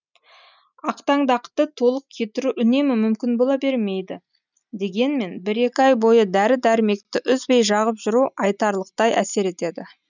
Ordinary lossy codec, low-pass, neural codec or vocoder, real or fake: none; 7.2 kHz; none; real